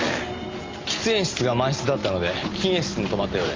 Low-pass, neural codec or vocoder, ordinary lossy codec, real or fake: 7.2 kHz; none; Opus, 32 kbps; real